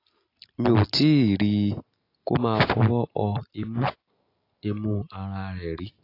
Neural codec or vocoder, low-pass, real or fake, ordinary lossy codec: none; 5.4 kHz; real; AAC, 32 kbps